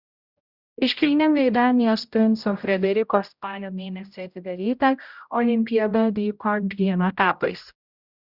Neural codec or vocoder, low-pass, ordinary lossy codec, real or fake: codec, 16 kHz, 0.5 kbps, X-Codec, HuBERT features, trained on general audio; 5.4 kHz; Opus, 64 kbps; fake